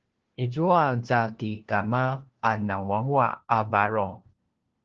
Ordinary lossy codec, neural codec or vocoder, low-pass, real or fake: Opus, 16 kbps; codec, 16 kHz, 1 kbps, FunCodec, trained on LibriTTS, 50 frames a second; 7.2 kHz; fake